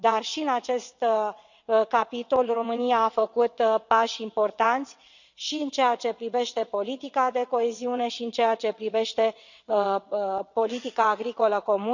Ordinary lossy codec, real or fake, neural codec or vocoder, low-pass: none; fake; vocoder, 22.05 kHz, 80 mel bands, WaveNeXt; 7.2 kHz